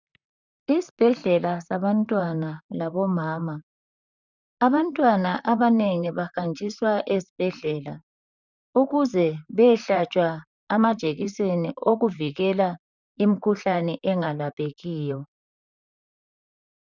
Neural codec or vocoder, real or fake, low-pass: vocoder, 44.1 kHz, 128 mel bands, Pupu-Vocoder; fake; 7.2 kHz